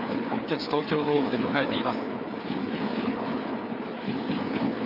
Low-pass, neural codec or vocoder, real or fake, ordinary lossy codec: 5.4 kHz; codec, 16 kHz, 4 kbps, FreqCodec, larger model; fake; none